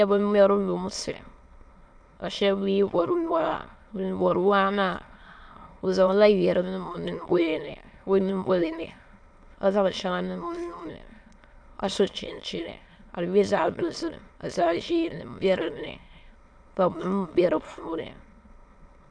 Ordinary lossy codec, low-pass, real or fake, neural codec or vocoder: AAC, 64 kbps; 9.9 kHz; fake; autoencoder, 22.05 kHz, a latent of 192 numbers a frame, VITS, trained on many speakers